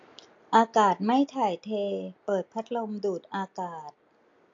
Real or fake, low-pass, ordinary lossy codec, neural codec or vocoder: real; 7.2 kHz; AAC, 48 kbps; none